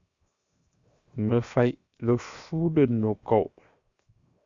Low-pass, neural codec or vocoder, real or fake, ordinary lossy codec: 7.2 kHz; codec, 16 kHz, 0.7 kbps, FocalCodec; fake; Opus, 64 kbps